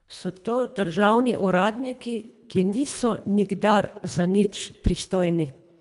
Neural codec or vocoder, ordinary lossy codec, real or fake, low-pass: codec, 24 kHz, 1.5 kbps, HILCodec; none; fake; 10.8 kHz